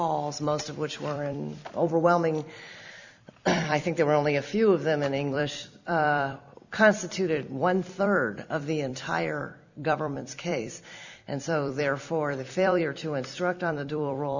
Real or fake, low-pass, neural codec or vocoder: fake; 7.2 kHz; vocoder, 44.1 kHz, 128 mel bands every 256 samples, BigVGAN v2